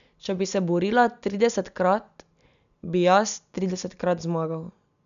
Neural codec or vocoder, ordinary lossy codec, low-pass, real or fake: none; none; 7.2 kHz; real